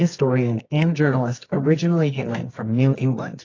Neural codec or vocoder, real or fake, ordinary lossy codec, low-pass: codec, 24 kHz, 0.9 kbps, WavTokenizer, medium music audio release; fake; AAC, 32 kbps; 7.2 kHz